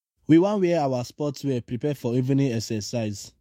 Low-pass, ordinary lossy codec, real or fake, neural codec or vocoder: 19.8 kHz; MP3, 64 kbps; fake; autoencoder, 48 kHz, 128 numbers a frame, DAC-VAE, trained on Japanese speech